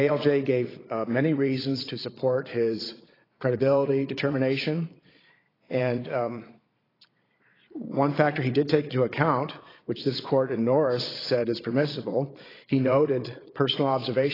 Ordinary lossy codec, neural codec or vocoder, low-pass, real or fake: AAC, 24 kbps; vocoder, 22.05 kHz, 80 mel bands, Vocos; 5.4 kHz; fake